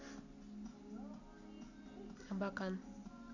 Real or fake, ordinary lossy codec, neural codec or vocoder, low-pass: real; none; none; 7.2 kHz